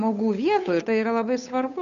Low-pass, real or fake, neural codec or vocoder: 7.2 kHz; fake; codec, 16 kHz, 8 kbps, FunCodec, trained on Chinese and English, 25 frames a second